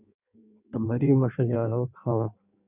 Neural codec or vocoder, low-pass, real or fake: codec, 16 kHz in and 24 kHz out, 1.1 kbps, FireRedTTS-2 codec; 3.6 kHz; fake